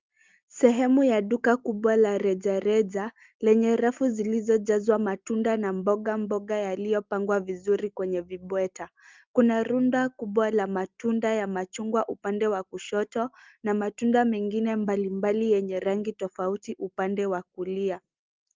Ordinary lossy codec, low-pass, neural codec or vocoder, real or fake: Opus, 32 kbps; 7.2 kHz; none; real